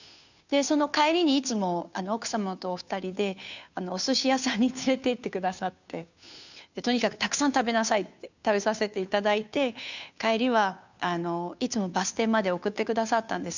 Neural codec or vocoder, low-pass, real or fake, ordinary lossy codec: codec, 16 kHz, 2 kbps, FunCodec, trained on Chinese and English, 25 frames a second; 7.2 kHz; fake; none